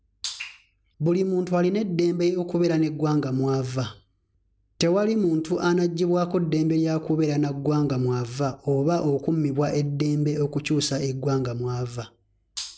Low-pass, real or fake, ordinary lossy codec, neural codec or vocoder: none; real; none; none